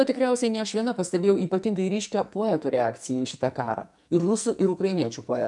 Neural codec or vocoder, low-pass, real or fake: codec, 44.1 kHz, 2.6 kbps, SNAC; 10.8 kHz; fake